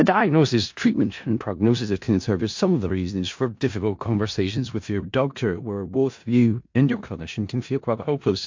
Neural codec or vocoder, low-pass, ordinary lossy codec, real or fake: codec, 16 kHz in and 24 kHz out, 0.4 kbps, LongCat-Audio-Codec, four codebook decoder; 7.2 kHz; MP3, 48 kbps; fake